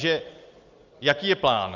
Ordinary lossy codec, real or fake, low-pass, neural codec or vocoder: Opus, 32 kbps; real; 7.2 kHz; none